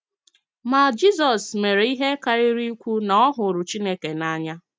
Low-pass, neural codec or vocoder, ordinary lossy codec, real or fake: none; none; none; real